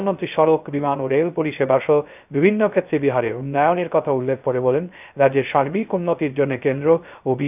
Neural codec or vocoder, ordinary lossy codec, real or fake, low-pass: codec, 16 kHz, 0.3 kbps, FocalCodec; none; fake; 3.6 kHz